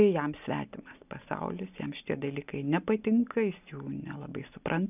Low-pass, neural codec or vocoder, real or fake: 3.6 kHz; none; real